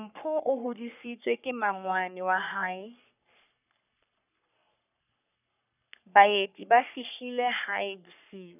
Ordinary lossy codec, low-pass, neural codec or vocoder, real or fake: none; 3.6 kHz; codec, 44.1 kHz, 3.4 kbps, Pupu-Codec; fake